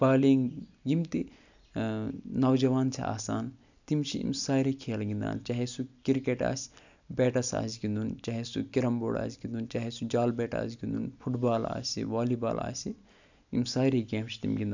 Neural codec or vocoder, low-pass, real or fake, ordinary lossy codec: none; 7.2 kHz; real; none